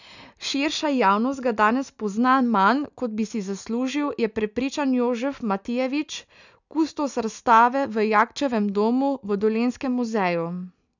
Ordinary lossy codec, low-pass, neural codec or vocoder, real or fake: none; 7.2 kHz; none; real